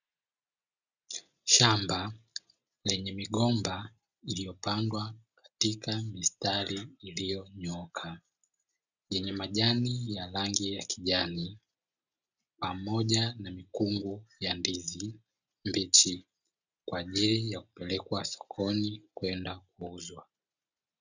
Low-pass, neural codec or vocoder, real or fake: 7.2 kHz; none; real